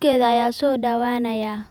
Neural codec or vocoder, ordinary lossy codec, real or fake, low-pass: vocoder, 48 kHz, 128 mel bands, Vocos; none; fake; 19.8 kHz